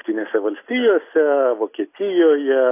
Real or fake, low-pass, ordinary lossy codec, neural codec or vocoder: real; 3.6 kHz; AAC, 24 kbps; none